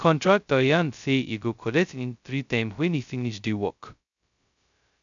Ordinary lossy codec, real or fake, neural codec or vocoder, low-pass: none; fake; codec, 16 kHz, 0.2 kbps, FocalCodec; 7.2 kHz